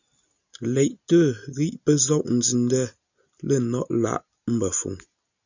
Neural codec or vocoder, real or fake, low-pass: none; real; 7.2 kHz